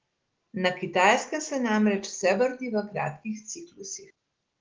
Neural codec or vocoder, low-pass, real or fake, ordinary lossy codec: none; 7.2 kHz; real; Opus, 16 kbps